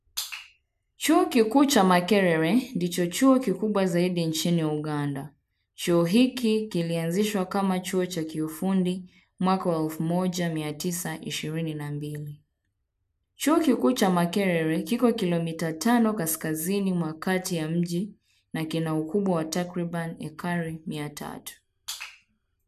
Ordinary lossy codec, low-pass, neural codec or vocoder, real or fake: none; 14.4 kHz; none; real